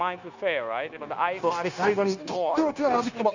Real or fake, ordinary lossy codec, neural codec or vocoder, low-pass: fake; none; codec, 16 kHz, 0.9 kbps, LongCat-Audio-Codec; 7.2 kHz